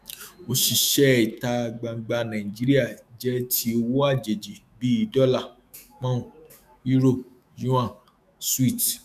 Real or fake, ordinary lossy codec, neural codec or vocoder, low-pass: fake; none; autoencoder, 48 kHz, 128 numbers a frame, DAC-VAE, trained on Japanese speech; 14.4 kHz